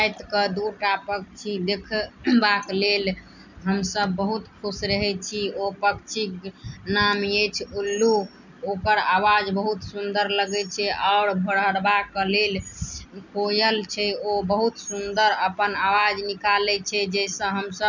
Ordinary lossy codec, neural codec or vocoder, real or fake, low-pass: none; none; real; 7.2 kHz